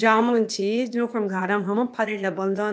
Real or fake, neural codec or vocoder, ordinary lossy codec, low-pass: fake; codec, 16 kHz, 0.8 kbps, ZipCodec; none; none